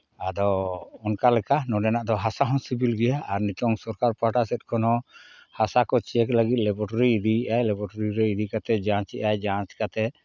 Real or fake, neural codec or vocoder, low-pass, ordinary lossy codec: real; none; 7.2 kHz; none